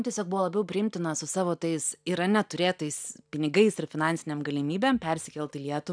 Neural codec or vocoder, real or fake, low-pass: none; real; 9.9 kHz